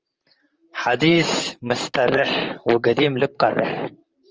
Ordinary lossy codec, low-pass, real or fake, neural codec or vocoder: Opus, 24 kbps; 7.2 kHz; fake; vocoder, 44.1 kHz, 128 mel bands, Pupu-Vocoder